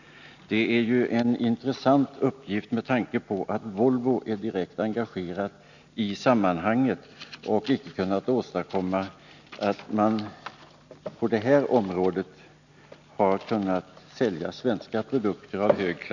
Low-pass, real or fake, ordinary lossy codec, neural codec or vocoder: 7.2 kHz; real; none; none